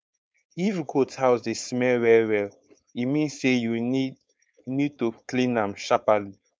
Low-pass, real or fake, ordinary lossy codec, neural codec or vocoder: none; fake; none; codec, 16 kHz, 4.8 kbps, FACodec